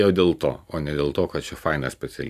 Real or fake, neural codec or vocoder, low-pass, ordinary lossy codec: real; none; 14.4 kHz; AAC, 96 kbps